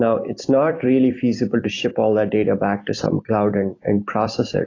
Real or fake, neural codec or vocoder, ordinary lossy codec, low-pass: fake; vocoder, 44.1 kHz, 128 mel bands every 256 samples, BigVGAN v2; AAC, 32 kbps; 7.2 kHz